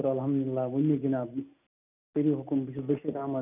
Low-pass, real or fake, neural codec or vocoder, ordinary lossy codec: 3.6 kHz; real; none; none